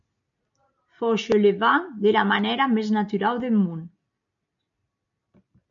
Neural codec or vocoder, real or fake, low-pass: none; real; 7.2 kHz